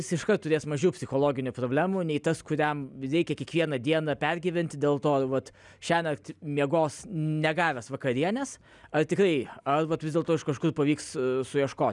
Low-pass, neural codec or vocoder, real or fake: 10.8 kHz; none; real